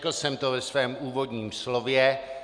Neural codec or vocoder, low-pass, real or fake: none; 9.9 kHz; real